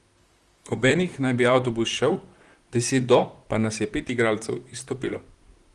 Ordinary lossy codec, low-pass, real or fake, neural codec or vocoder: Opus, 24 kbps; 10.8 kHz; fake; vocoder, 44.1 kHz, 128 mel bands, Pupu-Vocoder